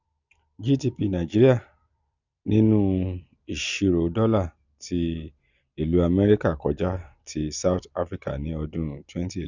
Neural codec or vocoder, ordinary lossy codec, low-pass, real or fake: none; none; 7.2 kHz; real